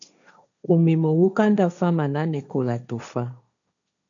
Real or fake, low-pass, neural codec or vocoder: fake; 7.2 kHz; codec, 16 kHz, 1.1 kbps, Voila-Tokenizer